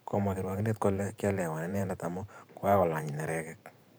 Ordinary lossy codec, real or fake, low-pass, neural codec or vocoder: none; fake; none; vocoder, 44.1 kHz, 128 mel bands every 512 samples, BigVGAN v2